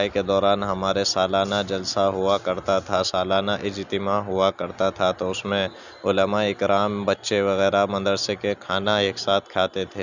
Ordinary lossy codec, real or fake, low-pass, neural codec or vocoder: none; real; 7.2 kHz; none